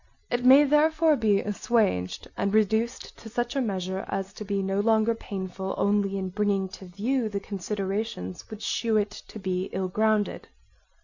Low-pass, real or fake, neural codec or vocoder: 7.2 kHz; real; none